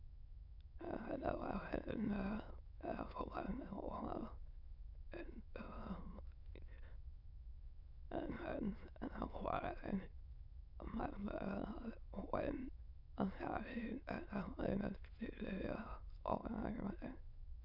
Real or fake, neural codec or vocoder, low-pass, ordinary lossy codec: fake; autoencoder, 22.05 kHz, a latent of 192 numbers a frame, VITS, trained on many speakers; 5.4 kHz; none